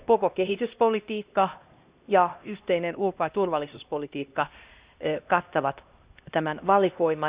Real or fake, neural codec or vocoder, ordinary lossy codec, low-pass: fake; codec, 16 kHz, 1 kbps, X-Codec, HuBERT features, trained on LibriSpeech; Opus, 64 kbps; 3.6 kHz